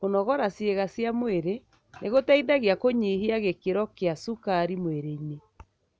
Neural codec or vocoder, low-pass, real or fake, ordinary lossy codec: none; none; real; none